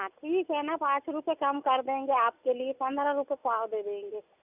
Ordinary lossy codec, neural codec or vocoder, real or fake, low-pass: none; none; real; 3.6 kHz